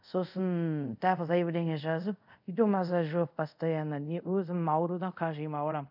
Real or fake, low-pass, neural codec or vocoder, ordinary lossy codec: fake; 5.4 kHz; codec, 24 kHz, 0.5 kbps, DualCodec; none